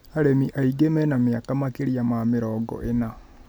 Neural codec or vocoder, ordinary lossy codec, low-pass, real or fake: none; none; none; real